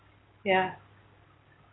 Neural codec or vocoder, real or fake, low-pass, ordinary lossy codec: none; real; 7.2 kHz; AAC, 16 kbps